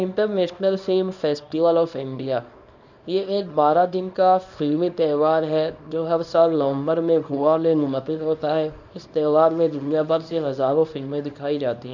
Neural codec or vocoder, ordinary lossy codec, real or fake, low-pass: codec, 24 kHz, 0.9 kbps, WavTokenizer, small release; none; fake; 7.2 kHz